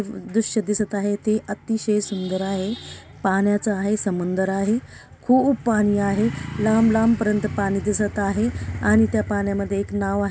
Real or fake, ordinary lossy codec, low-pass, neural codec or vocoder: real; none; none; none